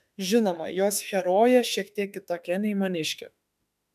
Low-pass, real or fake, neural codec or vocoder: 14.4 kHz; fake; autoencoder, 48 kHz, 32 numbers a frame, DAC-VAE, trained on Japanese speech